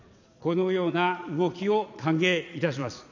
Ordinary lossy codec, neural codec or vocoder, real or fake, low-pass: none; vocoder, 22.05 kHz, 80 mel bands, Vocos; fake; 7.2 kHz